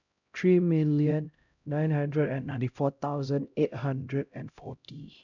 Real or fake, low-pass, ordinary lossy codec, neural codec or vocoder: fake; 7.2 kHz; none; codec, 16 kHz, 0.5 kbps, X-Codec, HuBERT features, trained on LibriSpeech